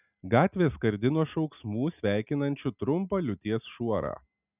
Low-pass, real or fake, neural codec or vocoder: 3.6 kHz; real; none